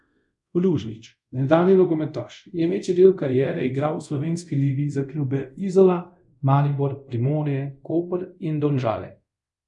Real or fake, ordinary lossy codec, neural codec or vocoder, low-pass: fake; none; codec, 24 kHz, 0.5 kbps, DualCodec; 10.8 kHz